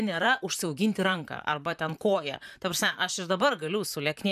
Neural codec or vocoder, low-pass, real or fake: vocoder, 44.1 kHz, 128 mel bands, Pupu-Vocoder; 14.4 kHz; fake